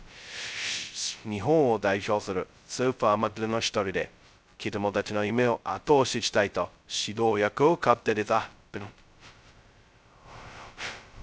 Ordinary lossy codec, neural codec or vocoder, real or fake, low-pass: none; codec, 16 kHz, 0.2 kbps, FocalCodec; fake; none